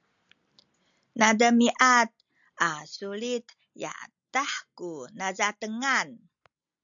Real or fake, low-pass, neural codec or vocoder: real; 7.2 kHz; none